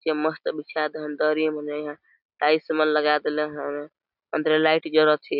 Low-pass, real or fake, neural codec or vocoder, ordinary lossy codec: 5.4 kHz; real; none; none